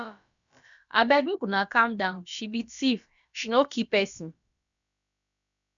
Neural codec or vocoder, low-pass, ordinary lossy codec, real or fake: codec, 16 kHz, about 1 kbps, DyCAST, with the encoder's durations; 7.2 kHz; none; fake